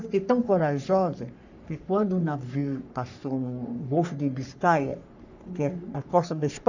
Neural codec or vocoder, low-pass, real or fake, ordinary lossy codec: codec, 44.1 kHz, 3.4 kbps, Pupu-Codec; 7.2 kHz; fake; none